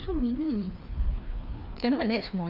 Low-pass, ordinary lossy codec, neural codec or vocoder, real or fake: 5.4 kHz; none; codec, 16 kHz, 2 kbps, FreqCodec, larger model; fake